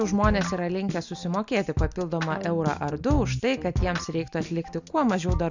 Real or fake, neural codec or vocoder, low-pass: real; none; 7.2 kHz